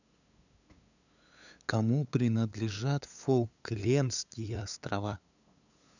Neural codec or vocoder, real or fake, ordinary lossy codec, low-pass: codec, 16 kHz, 8 kbps, FunCodec, trained on LibriTTS, 25 frames a second; fake; none; 7.2 kHz